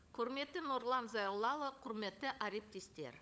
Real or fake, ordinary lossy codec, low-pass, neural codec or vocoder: fake; none; none; codec, 16 kHz, 16 kbps, FunCodec, trained on LibriTTS, 50 frames a second